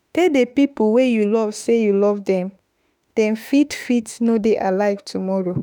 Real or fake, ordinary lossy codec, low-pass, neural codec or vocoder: fake; none; none; autoencoder, 48 kHz, 32 numbers a frame, DAC-VAE, trained on Japanese speech